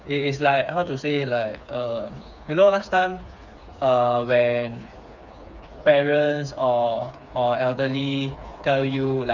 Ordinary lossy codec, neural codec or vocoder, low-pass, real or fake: none; codec, 16 kHz, 4 kbps, FreqCodec, smaller model; 7.2 kHz; fake